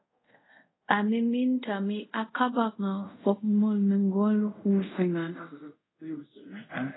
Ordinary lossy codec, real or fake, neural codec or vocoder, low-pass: AAC, 16 kbps; fake; codec, 24 kHz, 0.5 kbps, DualCodec; 7.2 kHz